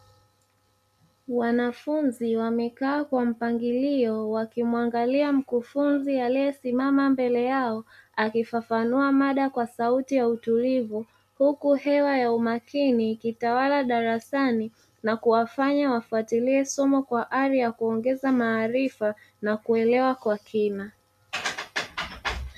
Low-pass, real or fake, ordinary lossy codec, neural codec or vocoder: 14.4 kHz; real; MP3, 96 kbps; none